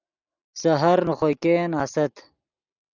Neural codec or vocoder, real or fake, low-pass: none; real; 7.2 kHz